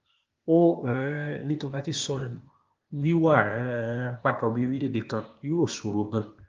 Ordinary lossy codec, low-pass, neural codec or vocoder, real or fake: Opus, 32 kbps; 7.2 kHz; codec, 16 kHz, 0.8 kbps, ZipCodec; fake